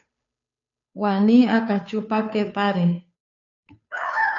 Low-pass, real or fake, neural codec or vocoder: 7.2 kHz; fake; codec, 16 kHz, 2 kbps, FunCodec, trained on Chinese and English, 25 frames a second